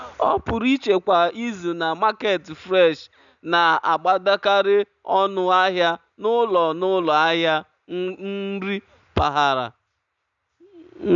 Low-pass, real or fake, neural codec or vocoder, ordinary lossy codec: 7.2 kHz; real; none; none